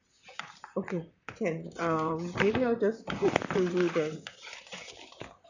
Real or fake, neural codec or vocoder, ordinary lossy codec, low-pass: fake; vocoder, 44.1 kHz, 128 mel bands, Pupu-Vocoder; none; 7.2 kHz